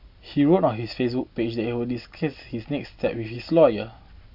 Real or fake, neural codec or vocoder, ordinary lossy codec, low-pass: real; none; AAC, 48 kbps; 5.4 kHz